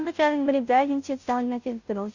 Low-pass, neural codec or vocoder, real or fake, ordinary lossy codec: 7.2 kHz; codec, 16 kHz, 0.5 kbps, FunCodec, trained on Chinese and English, 25 frames a second; fake; none